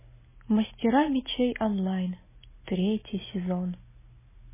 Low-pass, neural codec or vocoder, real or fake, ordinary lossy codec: 3.6 kHz; none; real; MP3, 16 kbps